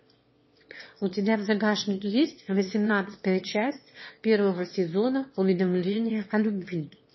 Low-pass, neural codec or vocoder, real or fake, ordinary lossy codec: 7.2 kHz; autoencoder, 22.05 kHz, a latent of 192 numbers a frame, VITS, trained on one speaker; fake; MP3, 24 kbps